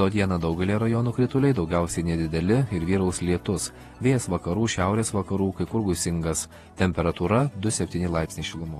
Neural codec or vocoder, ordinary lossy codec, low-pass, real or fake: vocoder, 48 kHz, 128 mel bands, Vocos; AAC, 32 kbps; 19.8 kHz; fake